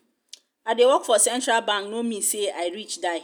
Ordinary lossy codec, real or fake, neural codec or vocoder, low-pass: none; real; none; none